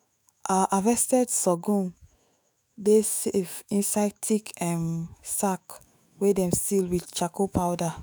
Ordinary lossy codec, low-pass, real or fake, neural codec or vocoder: none; none; fake; autoencoder, 48 kHz, 128 numbers a frame, DAC-VAE, trained on Japanese speech